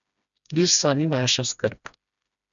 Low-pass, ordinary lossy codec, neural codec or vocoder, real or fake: 7.2 kHz; none; codec, 16 kHz, 1 kbps, FreqCodec, smaller model; fake